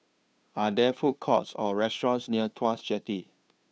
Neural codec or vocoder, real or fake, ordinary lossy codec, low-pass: codec, 16 kHz, 2 kbps, FunCodec, trained on Chinese and English, 25 frames a second; fake; none; none